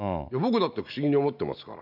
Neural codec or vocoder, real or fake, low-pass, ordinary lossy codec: none; real; 5.4 kHz; none